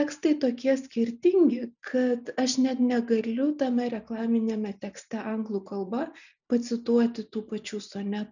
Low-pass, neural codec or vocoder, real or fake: 7.2 kHz; none; real